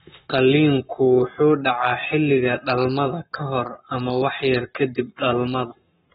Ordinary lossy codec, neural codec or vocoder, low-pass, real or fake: AAC, 16 kbps; codec, 44.1 kHz, 7.8 kbps, Pupu-Codec; 19.8 kHz; fake